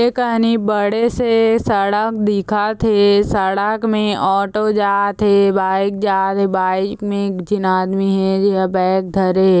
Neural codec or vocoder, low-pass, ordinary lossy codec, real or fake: none; none; none; real